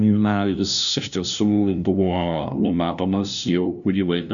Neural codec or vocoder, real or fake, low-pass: codec, 16 kHz, 0.5 kbps, FunCodec, trained on LibriTTS, 25 frames a second; fake; 7.2 kHz